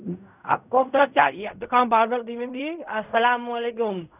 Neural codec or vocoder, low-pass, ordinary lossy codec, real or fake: codec, 16 kHz in and 24 kHz out, 0.4 kbps, LongCat-Audio-Codec, fine tuned four codebook decoder; 3.6 kHz; none; fake